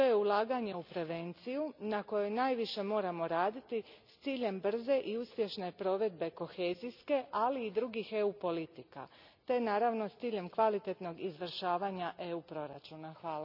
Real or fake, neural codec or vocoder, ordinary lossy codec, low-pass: real; none; none; 5.4 kHz